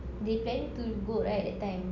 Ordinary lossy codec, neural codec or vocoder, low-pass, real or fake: none; none; 7.2 kHz; real